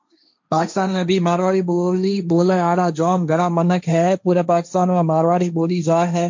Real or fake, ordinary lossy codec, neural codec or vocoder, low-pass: fake; MP3, 48 kbps; codec, 16 kHz, 1.1 kbps, Voila-Tokenizer; 7.2 kHz